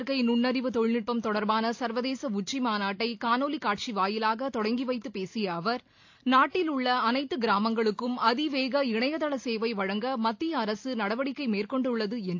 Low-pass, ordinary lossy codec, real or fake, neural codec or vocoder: 7.2 kHz; AAC, 48 kbps; real; none